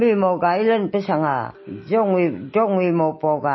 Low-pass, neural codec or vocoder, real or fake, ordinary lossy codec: 7.2 kHz; none; real; MP3, 24 kbps